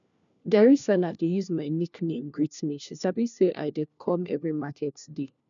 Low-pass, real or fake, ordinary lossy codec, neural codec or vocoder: 7.2 kHz; fake; none; codec, 16 kHz, 1 kbps, FunCodec, trained on LibriTTS, 50 frames a second